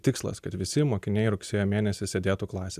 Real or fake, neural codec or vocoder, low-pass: fake; vocoder, 44.1 kHz, 128 mel bands every 256 samples, BigVGAN v2; 14.4 kHz